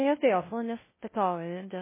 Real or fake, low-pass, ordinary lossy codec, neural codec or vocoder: fake; 3.6 kHz; MP3, 16 kbps; codec, 16 kHz, 0.5 kbps, FunCodec, trained on LibriTTS, 25 frames a second